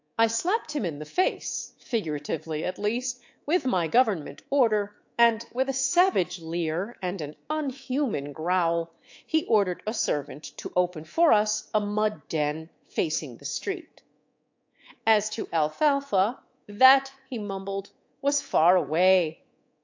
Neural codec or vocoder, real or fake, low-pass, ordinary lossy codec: codec, 24 kHz, 3.1 kbps, DualCodec; fake; 7.2 kHz; AAC, 48 kbps